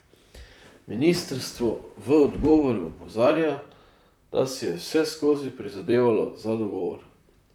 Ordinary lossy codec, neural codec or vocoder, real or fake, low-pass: none; vocoder, 44.1 kHz, 128 mel bands, Pupu-Vocoder; fake; 19.8 kHz